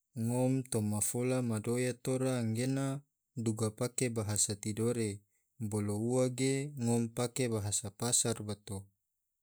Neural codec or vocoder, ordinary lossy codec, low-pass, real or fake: none; none; none; real